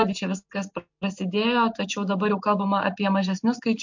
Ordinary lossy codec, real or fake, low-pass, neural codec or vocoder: MP3, 48 kbps; real; 7.2 kHz; none